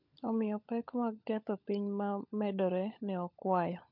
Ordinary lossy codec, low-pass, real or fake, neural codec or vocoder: MP3, 48 kbps; 5.4 kHz; fake; codec, 16 kHz, 16 kbps, FunCodec, trained on LibriTTS, 50 frames a second